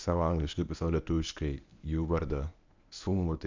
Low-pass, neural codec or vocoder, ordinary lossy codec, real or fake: 7.2 kHz; codec, 24 kHz, 0.9 kbps, WavTokenizer, medium speech release version 1; MP3, 64 kbps; fake